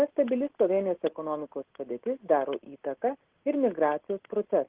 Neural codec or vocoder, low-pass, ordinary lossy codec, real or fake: none; 3.6 kHz; Opus, 16 kbps; real